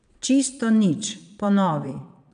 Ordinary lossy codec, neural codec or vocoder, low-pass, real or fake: none; vocoder, 22.05 kHz, 80 mel bands, Vocos; 9.9 kHz; fake